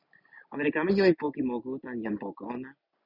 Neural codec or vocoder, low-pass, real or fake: none; 5.4 kHz; real